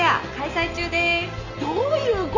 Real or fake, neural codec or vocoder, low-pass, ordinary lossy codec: real; none; 7.2 kHz; none